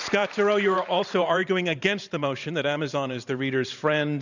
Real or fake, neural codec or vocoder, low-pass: fake; vocoder, 44.1 kHz, 128 mel bands every 512 samples, BigVGAN v2; 7.2 kHz